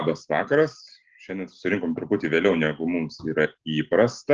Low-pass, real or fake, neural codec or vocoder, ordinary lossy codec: 7.2 kHz; real; none; Opus, 16 kbps